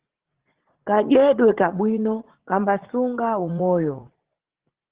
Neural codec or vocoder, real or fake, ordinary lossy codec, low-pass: vocoder, 44.1 kHz, 80 mel bands, Vocos; fake; Opus, 16 kbps; 3.6 kHz